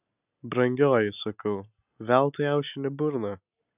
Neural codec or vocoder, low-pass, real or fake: none; 3.6 kHz; real